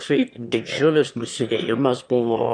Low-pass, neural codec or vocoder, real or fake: 9.9 kHz; autoencoder, 22.05 kHz, a latent of 192 numbers a frame, VITS, trained on one speaker; fake